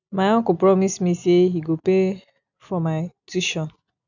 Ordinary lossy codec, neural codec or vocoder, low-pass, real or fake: none; none; 7.2 kHz; real